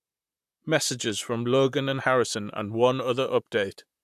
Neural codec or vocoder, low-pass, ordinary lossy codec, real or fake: vocoder, 44.1 kHz, 128 mel bands, Pupu-Vocoder; 14.4 kHz; AAC, 96 kbps; fake